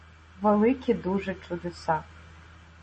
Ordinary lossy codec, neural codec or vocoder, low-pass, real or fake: MP3, 32 kbps; none; 10.8 kHz; real